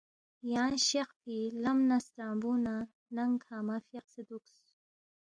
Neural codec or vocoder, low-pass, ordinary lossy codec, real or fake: none; 9.9 kHz; AAC, 64 kbps; real